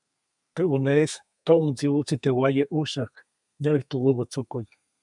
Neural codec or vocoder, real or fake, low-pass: codec, 32 kHz, 1.9 kbps, SNAC; fake; 10.8 kHz